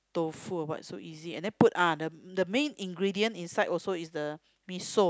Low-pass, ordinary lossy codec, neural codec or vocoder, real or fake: none; none; none; real